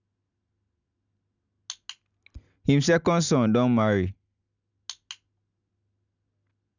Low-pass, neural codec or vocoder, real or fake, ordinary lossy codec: 7.2 kHz; none; real; none